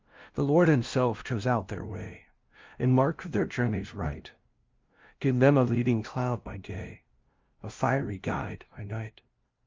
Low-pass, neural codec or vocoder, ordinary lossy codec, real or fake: 7.2 kHz; codec, 16 kHz, 0.5 kbps, FunCodec, trained on LibriTTS, 25 frames a second; Opus, 32 kbps; fake